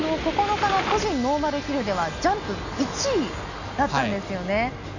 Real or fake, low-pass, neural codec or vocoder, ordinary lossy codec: real; 7.2 kHz; none; none